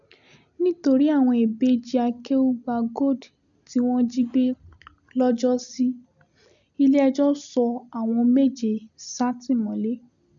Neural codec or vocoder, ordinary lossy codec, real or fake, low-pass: none; none; real; 7.2 kHz